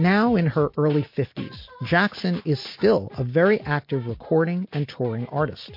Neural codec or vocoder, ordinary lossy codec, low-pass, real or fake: none; MP3, 32 kbps; 5.4 kHz; real